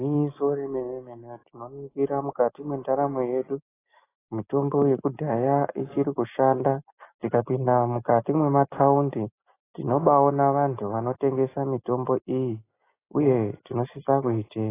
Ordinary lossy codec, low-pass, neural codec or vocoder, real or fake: AAC, 16 kbps; 3.6 kHz; none; real